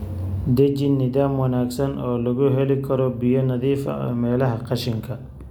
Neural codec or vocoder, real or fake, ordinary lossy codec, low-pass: none; real; none; 19.8 kHz